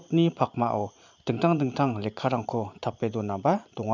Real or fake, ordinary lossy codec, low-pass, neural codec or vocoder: fake; none; 7.2 kHz; vocoder, 44.1 kHz, 128 mel bands every 512 samples, BigVGAN v2